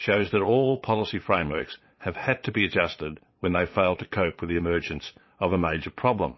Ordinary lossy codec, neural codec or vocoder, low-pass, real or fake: MP3, 24 kbps; vocoder, 22.05 kHz, 80 mel bands, Vocos; 7.2 kHz; fake